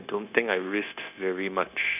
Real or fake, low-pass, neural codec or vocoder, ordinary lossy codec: fake; 3.6 kHz; codec, 16 kHz, 0.9 kbps, LongCat-Audio-Codec; none